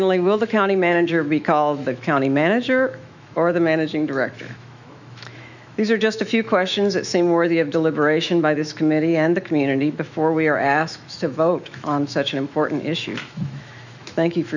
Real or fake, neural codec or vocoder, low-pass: fake; autoencoder, 48 kHz, 128 numbers a frame, DAC-VAE, trained on Japanese speech; 7.2 kHz